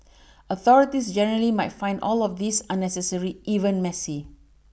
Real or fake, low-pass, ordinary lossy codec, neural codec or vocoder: real; none; none; none